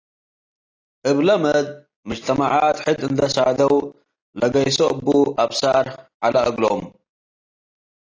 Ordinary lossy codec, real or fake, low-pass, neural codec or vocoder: AAC, 32 kbps; real; 7.2 kHz; none